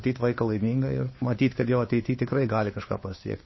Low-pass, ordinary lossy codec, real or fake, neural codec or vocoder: 7.2 kHz; MP3, 24 kbps; fake; codec, 16 kHz, 2 kbps, FunCodec, trained on Chinese and English, 25 frames a second